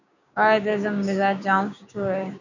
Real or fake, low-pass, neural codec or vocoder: fake; 7.2 kHz; codec, 16 kHz, 6 kbps, DAC